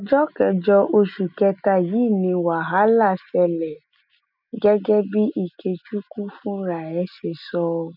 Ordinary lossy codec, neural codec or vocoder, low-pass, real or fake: none; none; 5.4 kHz; real